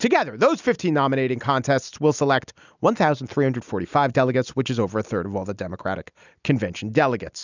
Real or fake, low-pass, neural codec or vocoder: real; 7.2 kHz; none